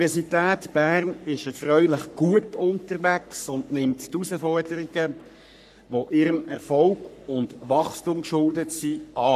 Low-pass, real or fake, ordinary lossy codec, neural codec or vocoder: 14.4 kHz; fake; none; codec, 44.1 kHz, 3.4 kbps, Pupu-Codec